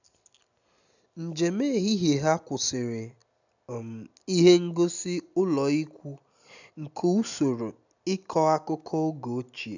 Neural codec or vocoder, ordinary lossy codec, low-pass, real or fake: none; none; 7.2 kHz; real